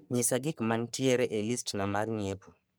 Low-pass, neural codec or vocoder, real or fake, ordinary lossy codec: none; codec, 44.1 kHz, 3.4 kbps, Pupu-Codec; fake; none